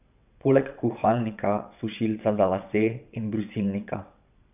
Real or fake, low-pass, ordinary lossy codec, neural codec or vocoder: fake; 3.6 kHz; none; vocoder, 22.05 kHz, 80 mel bands, WaveNeXt